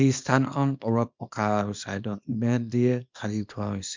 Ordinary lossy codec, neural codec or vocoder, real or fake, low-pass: MP3, 64 kbps; codec, 24 kHz, 0.9 kbps, WavTokenizer, small release; fake; 7.2 kHz